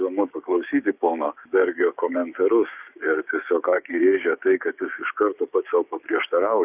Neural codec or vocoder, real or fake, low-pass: codec, 16 kHz, 6 kbps, DAC; fake; 3.6 kHz